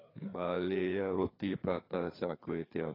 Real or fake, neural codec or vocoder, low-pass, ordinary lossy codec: fake; codec, 16 kHz, 4 kbps, FreqCodec, larger model; 5.4 kHz; AAC, 24 kbps